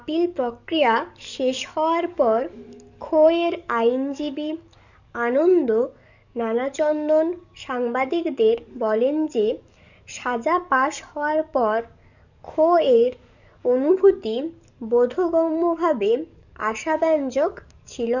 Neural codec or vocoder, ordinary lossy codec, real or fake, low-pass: codec, 44.1 kHz, 7.8 kbps, DAC; none; fake; 7.2 kHz